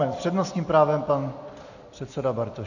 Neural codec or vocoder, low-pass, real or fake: none; 7.2 kHz; real